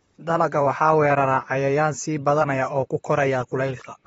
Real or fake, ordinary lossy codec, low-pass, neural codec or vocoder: fake; AAC, 24 kbps; 19.8 kHz; vocoder, 44.1 kHz, 128 mel bands, Pupu-Vocoder